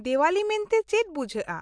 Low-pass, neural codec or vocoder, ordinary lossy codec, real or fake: 9.9 kHz; none; AAC, 64 kbps; real